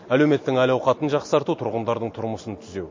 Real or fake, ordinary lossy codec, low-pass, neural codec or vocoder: real; MP3, 32 kbps; 7.2 kHz; none